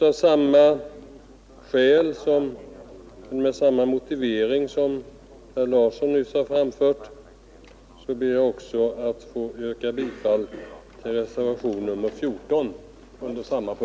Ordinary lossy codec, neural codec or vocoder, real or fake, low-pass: none; none; real; none